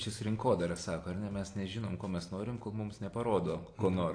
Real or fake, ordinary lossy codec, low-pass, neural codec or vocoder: real; AAC, 48 kbps; 9.9 kHz; none